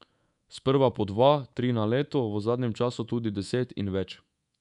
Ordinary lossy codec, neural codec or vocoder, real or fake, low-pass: none; codec, 24 kHz, 3.1 kbps, DualCodec; fake; 10.8 kHz